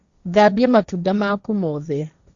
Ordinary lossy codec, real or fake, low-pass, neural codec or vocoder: Opus, 64 kbps; fake; 7.2 kHz; codec, 16 kHz, 1.1 kbps, Voila-Tokenizer